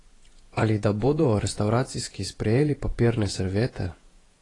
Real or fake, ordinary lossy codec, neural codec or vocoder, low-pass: fake; AAC, 32 kbps; vocoder, 48 kHz, 128 mel bands, Vocos; 10.8 kHz